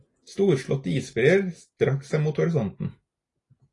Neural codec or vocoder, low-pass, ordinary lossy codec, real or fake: none; 10.8 kHz; AAC, 32 kbps; real